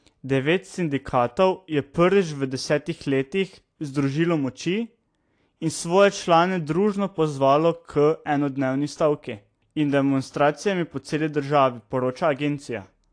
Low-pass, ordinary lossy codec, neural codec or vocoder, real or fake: 9.9 kHz; AAC, 48 kbps; none; real